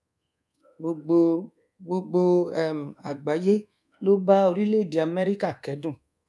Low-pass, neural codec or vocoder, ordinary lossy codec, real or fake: none; codec, 24 kHz, 1.2 kbps, DualCodec; none; fake